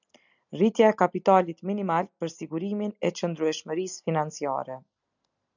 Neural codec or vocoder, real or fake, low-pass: none; real; 7.2 kHz